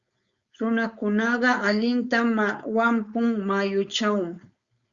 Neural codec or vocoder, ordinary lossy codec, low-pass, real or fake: codec, 16 kHz, 4.8 kbps, FACodec; Opus, 64 kbps; 7.2 kHz; fake